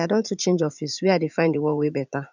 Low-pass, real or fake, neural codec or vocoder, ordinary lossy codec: 7.2 kHz; fake; vocoder, 44.1 kHz, 80 mel bands, Vocos; none